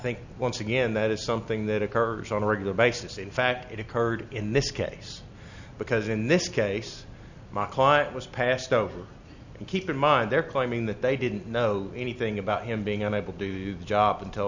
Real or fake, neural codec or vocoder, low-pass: real; none; 7.2 kHz